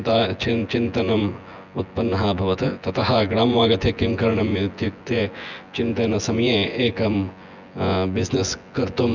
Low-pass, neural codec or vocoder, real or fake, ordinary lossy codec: 7.2 kHz; vocoder, 24 kHz, 100 mel bands, Vocos; fake; none